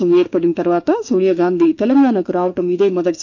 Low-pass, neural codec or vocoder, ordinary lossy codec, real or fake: 7.2 kHz; autoencoder, 48 kHz, 32 numbers a frame, DAC-VAE, trained on Japanese speech; none; fake